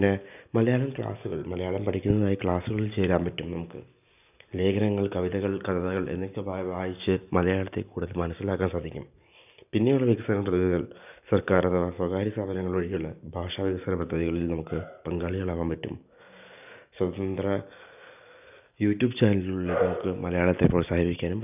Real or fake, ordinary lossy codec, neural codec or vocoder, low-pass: real; none; none; 3.6 kHz